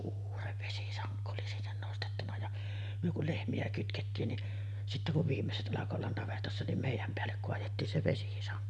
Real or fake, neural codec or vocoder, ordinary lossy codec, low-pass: real; none; none; none